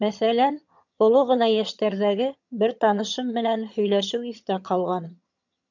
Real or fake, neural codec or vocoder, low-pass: fake; vocoder, 22.05 kHz, 80 mel bands, HiFi-GAN; 7.2 kHz